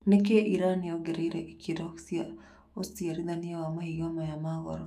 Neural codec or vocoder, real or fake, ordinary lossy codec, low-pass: autoencoder, 48 kHz, 128 numbers a frame, DAC-VAE, trained on Japanese speech; fake; none; 14.4 kHz